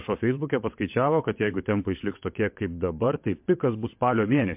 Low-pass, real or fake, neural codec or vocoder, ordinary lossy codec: 3.6 kHz; fake; vocoder, 22.05 kHz, 80 mel bands, WaveNeXt; MP3, 32 kbps